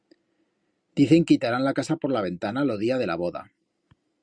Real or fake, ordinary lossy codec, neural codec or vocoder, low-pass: real; Opus, 64 kbps; none; 9.9 kHz